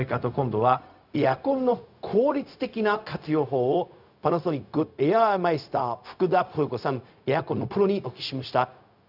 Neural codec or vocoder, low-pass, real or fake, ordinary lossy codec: codec, 16 kHz, 0.4 kbps, LongCat-Audio-Codec; 5.4 kHz; fake; none